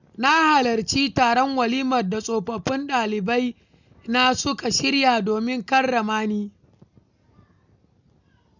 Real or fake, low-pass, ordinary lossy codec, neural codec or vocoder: real; 7.2 kHz; none; none